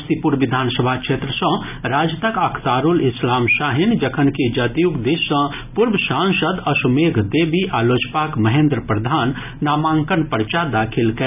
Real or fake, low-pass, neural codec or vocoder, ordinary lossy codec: real; 3.6 kHz; none; none